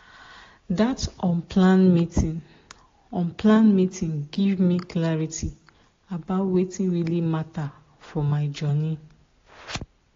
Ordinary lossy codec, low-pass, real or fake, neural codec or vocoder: AAC, 32 kbps; 7.2 kHz; real; none